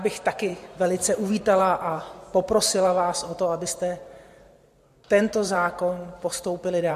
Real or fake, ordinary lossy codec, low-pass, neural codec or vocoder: fake; MP3, 64 kbps; 14.4 kHz; vocoder, 44.1 kHz, 128 mel bands every 512 samples, BigVGAN v2